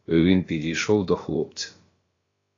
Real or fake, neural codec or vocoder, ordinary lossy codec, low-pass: fake; codec, 16 kHz, about 1 kbps, DyCAST, with the encoder's durations; AAC, 32 kbps; 7.2 kHz